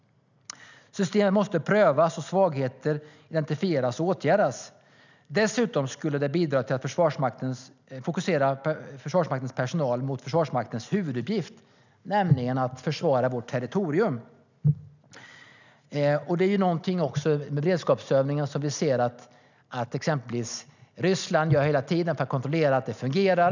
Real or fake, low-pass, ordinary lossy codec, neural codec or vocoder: real; 7.2 kHz; none; none